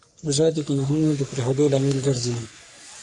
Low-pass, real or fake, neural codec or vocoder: 10.8 kHz; fake; codec, 44.1 kHz, 3.4 kbps, Pupu-Codec